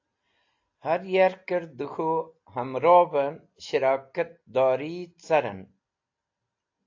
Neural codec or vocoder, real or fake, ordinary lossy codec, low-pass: none; real; AAC, 48 kbps; 7.2 kHz